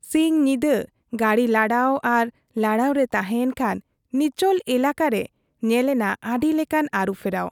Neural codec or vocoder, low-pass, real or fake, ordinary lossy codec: none; 19.8 kHz; real; none